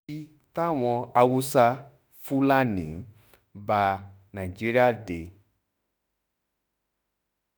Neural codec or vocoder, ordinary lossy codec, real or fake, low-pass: autoencoder, 48 kHz, 32 numbers a frame, DAC-VAE, trained on Japanese speech; none; fake; none